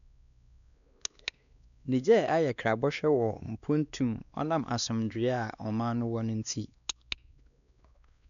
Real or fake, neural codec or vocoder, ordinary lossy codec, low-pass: fake; codec, 16 kHz, 2 kbps, X-Codec, WavLM features, trained on Multilingual LibriSpeech; none; 7.2 kHz